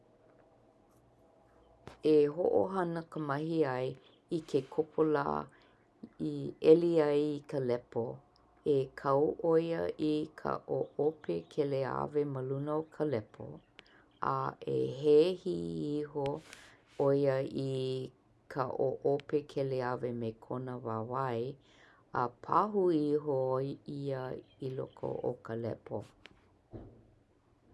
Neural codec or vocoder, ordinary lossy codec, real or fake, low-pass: none; none; real; none